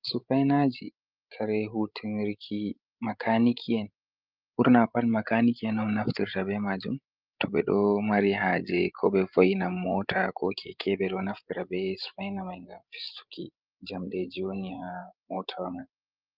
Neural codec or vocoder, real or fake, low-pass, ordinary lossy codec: codec, 16 kHz, 16 kbps, FreqCodec, larger model; fake; 5.4 kHz; Opus, 24 kbps